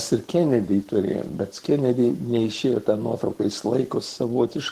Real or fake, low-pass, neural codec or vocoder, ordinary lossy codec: fake; 14.4 kHz; codec, 44.1 kHz, 7.8 kbps, Pupu-Codec; Opus, 16 kbps